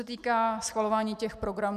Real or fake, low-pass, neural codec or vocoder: real; 14.4 kHz; none